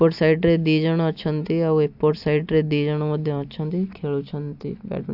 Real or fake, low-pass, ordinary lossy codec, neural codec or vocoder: real; 5.4 kHz; AAC, 48 kbps; none